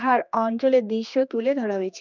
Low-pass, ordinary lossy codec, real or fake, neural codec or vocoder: 7.2 kHz; none; fake; codec, 16 kHz, 2 kbps, X-Codec, HuBERT features, trained on general audio